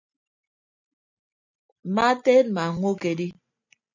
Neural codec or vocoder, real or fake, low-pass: none; real; 7.2 kHz